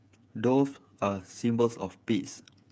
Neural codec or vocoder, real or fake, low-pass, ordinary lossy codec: codec, 16 kHz, 8 kbps, FreqCodec, smaller model; fake; none; none